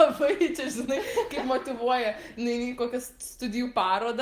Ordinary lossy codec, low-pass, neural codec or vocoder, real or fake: Opus, 24 kbps; 14.4 kHz; none; real